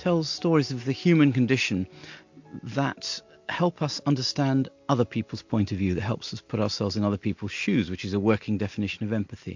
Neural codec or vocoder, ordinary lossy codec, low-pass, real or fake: none; MP3, 48 kbps; 7.2 kHz; real